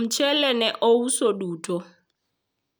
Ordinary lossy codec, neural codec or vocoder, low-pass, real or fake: none; none; none; real